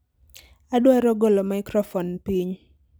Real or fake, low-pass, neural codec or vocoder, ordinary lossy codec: real; none; none; none